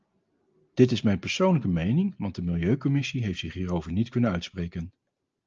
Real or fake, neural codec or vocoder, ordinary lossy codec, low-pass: real; none; Opus, 24 kbps; 7.2 kHz